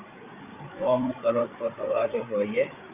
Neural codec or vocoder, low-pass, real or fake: codec, 16 kHz, 8 kbps, FreqCodec, larger model; 3.6 kHz; fake